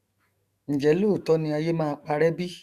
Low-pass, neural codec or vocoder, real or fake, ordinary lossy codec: 14.4 kHz; autoencoder, 48 kHz, 128 numbers a frame, DAC-VAE, trained on Japanese speech; fake; Opus, 64 kbps